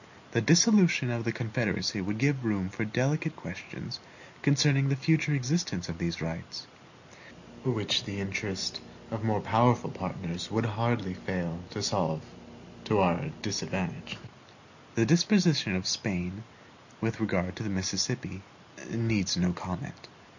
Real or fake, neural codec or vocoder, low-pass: real; none; 7.2 kHz